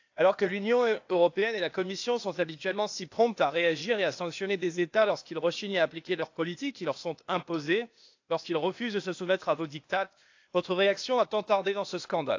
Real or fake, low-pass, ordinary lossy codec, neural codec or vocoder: fake; 7.2 kHz; AAC, 48 kbps; codec, 16 kHz, 0.8 kbps, ZipCodec